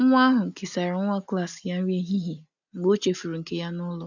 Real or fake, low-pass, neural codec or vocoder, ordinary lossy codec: real; 7.2 kHz; none; none